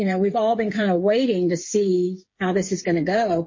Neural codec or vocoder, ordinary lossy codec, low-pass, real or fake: codec, 16 kHz, 8 kbps, FreqCodec, smaller model; MP3, 32 kbps; 7.2 kHz; fake